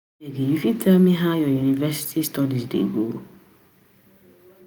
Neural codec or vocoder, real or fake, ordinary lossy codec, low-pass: none; real; none; none